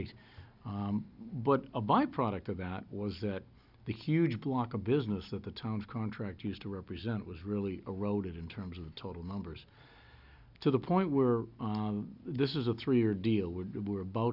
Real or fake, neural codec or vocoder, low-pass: real; none; 5.4 kHz